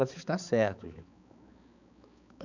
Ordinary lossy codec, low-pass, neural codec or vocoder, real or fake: none; 7.2 kHz; codec, 16 kHz, 4 kbps, X-Codec, HuBERT features, trained on balanced general audio; fake